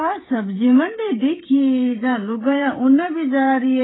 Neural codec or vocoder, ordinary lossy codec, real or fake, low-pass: codec, 16 kHz, 8 kbps, FreqCodec, smaller model; AAC, 16 kbps; fake; 7.2 kHz